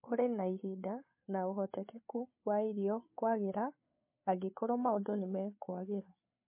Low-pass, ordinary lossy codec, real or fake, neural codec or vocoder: 3.6 kHz; MP3, 24 kbps; fake; codec, 16 kHz, 8 kbps, FreqCodec, larger model